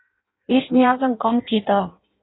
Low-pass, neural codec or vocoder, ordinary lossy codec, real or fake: 7.2 kHz; codec, 16 kHz in and 24 kHz out, 0.6 kbps, FireRedTTS-2 codec; AAC, 16 kbps; fake